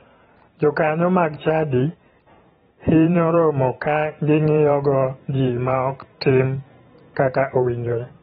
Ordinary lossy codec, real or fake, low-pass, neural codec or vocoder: AAC, 16 kbps; fake; 19.8 kHz; vocoder, 44.1 kHz, 128 mel bands every 512 samples, BigVGAN v2